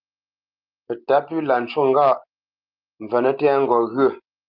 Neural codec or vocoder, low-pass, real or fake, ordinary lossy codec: none; 5.4 kHz; real; Opus, 32 kbps